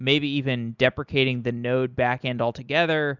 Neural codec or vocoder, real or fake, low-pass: none; real; 7.2 kHz